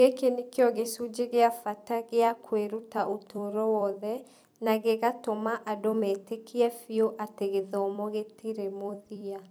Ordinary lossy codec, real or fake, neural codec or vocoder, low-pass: none; fake; vocoder, 44.1 kHz, 128 mel bands every 256 samples, BigVGAN v2; none